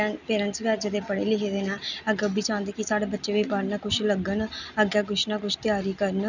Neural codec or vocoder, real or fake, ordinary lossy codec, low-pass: none; real; none; 7.2 kHz